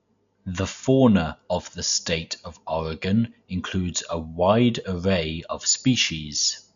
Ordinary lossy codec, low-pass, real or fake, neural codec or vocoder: none; 7.2 kHz; real; none